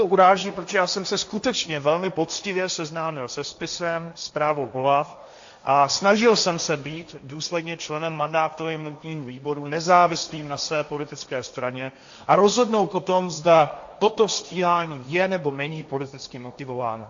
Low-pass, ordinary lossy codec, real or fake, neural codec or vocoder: 7.2 kHz; AAC, 64 kbps; fake; codec, 16 kHz, 1.1 kbps, Voila-Tokenizer